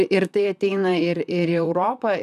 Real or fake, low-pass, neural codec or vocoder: fake; 14.4 kHz; vocoder, 44.1 kHz, 128 mel bands, Pupu-Vocoder